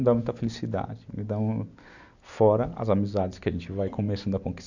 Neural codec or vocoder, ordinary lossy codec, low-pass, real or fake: none; none; 7.2 kHz; real